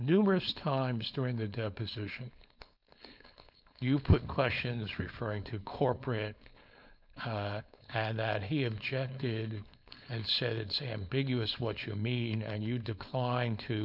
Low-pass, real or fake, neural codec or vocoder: 5.4 kHz; fake; codec, 16 kHz, 4.8 kbps, FACodec